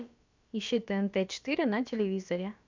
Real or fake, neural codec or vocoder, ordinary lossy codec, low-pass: fake; codec, 16 kHz, about 1 kbps, DyCAST, with the encoder's durations; MP3, 64 kbps; 7.2 kHz